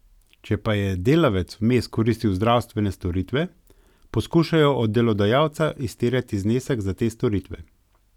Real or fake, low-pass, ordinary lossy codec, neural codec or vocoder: real; 19.8 kHz; none; none